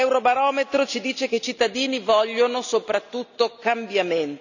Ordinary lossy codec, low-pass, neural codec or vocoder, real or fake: none; 7.2 kHz; none; real